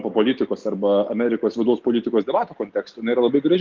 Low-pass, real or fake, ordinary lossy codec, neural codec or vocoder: 7.2 kHz; real; Opus, 32 kbps; none